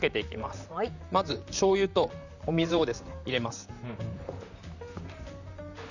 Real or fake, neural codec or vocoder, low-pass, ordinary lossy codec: fake; vocoder, 44.1 kHz, 128 mel bands, Pupu-Vocoder; 7.2 kHz; none